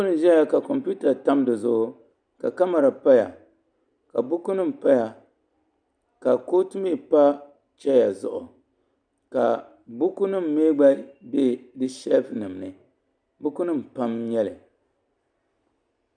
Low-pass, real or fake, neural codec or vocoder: 9.9 kHz; real; none